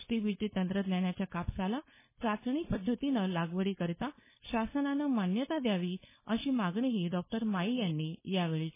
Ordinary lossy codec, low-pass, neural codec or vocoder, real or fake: MP3, 16 kbps; 3.6 kHz; codec, 16 kHz, 4.8 kbps, FACodec; fake